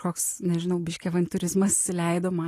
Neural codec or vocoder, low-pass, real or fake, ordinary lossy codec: none; 14.4 kHz; real; AAC, 48 kbps